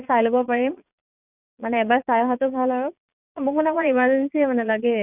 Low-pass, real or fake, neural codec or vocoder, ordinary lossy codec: 3.6 kHz; fake; vocoder, 22.05 kHz, 80 mel bands, Vocos; none